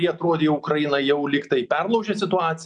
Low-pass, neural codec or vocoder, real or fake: 10.8 kHz; none; real